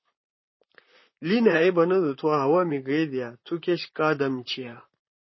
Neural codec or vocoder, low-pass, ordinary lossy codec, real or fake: vocoder, 44.1 kHz, 128 mel bands, Pupu-Vocoder; 7.2 kHz; MP3, 24 kbps; fake